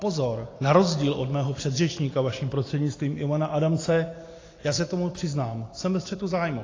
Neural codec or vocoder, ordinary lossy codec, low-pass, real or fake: none; AAC, 32 kbps; 7.2 kHz; real